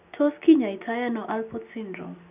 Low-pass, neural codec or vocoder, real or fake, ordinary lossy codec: 3.6 kHz; none; real; none